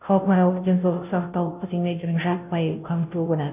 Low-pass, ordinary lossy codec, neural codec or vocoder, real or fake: 3.6 kHz; none; codec, 16 kHz, 0.5 kbps, FunCodec, trained on Chinese and English, 25 frames a second; fake